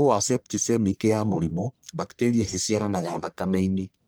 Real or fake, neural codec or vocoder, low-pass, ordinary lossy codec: fake; codec, 44.1 kHz, 1.7 kbps, Pupu-Codec; none; none